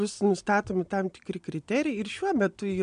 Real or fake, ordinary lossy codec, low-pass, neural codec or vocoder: real; MP3, 64 kbps; 9.9 kHz; none